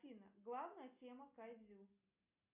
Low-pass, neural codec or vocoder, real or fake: 3.6 kHz; none; real